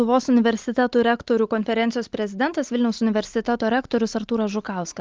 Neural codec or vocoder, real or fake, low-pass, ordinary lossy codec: none; real; 7.2 kHz; Opus, 32 kbps